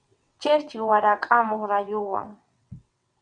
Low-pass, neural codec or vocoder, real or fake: 9.9 kHz; vocoder, 22.05 kHz, 80 mel bands, WaveNeXt; fake